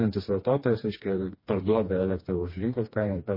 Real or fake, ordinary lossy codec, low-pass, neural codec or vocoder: fake; MP3, 24 kbps; 5.4 kHz; codec, 16 kHz, 2 kbps, FreqCodec, smaller model